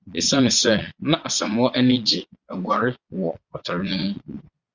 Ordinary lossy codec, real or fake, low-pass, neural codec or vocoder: Opus, 64 kbps; fake; 7.2 kHz; vocoder, 22.05 kHz, 80 mel bands, Vocos